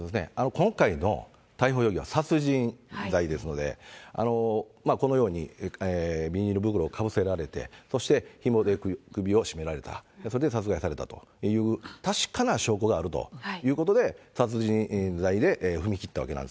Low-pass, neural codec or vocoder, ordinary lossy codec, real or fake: none; none; none; real